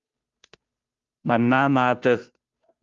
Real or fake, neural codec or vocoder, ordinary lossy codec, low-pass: fake; codec, 16 kHz, 0.5 kbps, FunCodec, trained on Chinese and English, 25 frames a second; Opus, 32 kbps; 7.2 kHz